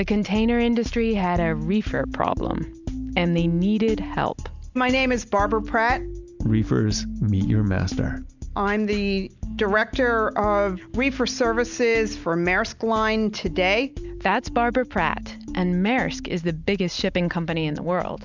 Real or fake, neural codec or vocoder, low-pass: real; none; 7.2 kHz